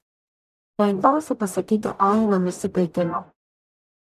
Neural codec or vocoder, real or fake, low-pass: codec, 44.1 kHz, 0.9 kbps, DAC; fake; 14.4 kHz